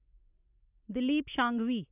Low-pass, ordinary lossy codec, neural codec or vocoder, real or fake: 3.6 kHz; none; none; real